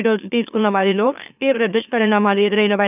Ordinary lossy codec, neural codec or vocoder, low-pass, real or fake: none; autoencoder, 44.1 kHz, a latent of 192 numbers a frame, MeloTTS; 3.6 kHz; fake